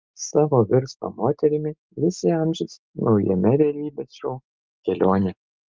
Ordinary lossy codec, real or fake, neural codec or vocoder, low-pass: Opus, 24 kbps; real; none; 7.2 kHz